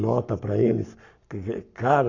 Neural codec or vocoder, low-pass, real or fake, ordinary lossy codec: codec, 44.1 kHz, 7.8 kbps, Pupu-Codec; 7.2 kHz; fake; none